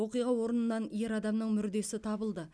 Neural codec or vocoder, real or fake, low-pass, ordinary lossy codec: none; real; none; none